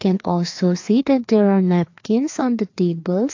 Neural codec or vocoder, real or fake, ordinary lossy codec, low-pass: codec, 16 kHz, 2 kbps, FreqCodec, larger model; fake; AAC, 48 kbps; 7.2 kHz